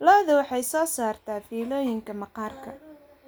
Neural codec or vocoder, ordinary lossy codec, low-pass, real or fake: none; none; none; real